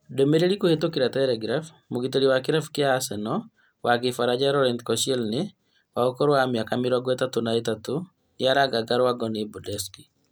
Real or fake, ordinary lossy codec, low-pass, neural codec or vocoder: real; none; none; none